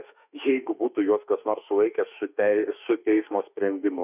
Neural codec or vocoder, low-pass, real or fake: autoencoder, 48 kHz, 32 numbers a frame, DAC-VAE, trained on Japanese speech; 3.6 kHz; fake